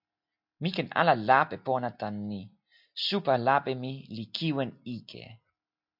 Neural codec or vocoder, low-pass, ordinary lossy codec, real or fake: none; 5.4 kHz; MP3, 48 kbps; real